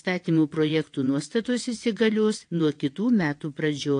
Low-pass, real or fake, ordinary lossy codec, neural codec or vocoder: 9.9 kHz; fake; AAC, 48 kbps; vocoder, 22.05 kHz, 80 mel bands, WaveNeXt